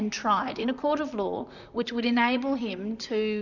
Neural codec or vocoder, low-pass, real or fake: vocoder, 44.1 kHz, 128 mel bands, Pupu-Vocoder; 7.2 kHz; fake